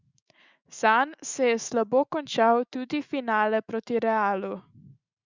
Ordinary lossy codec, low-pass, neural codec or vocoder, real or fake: Opus, 64 kbps; 7.2 kHz; autoencoder, 48 kHz, 128 numbers a frame, DAC-VAE, trained on Japanese speech; fake